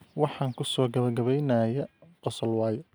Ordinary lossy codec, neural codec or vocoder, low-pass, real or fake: none; none; none; real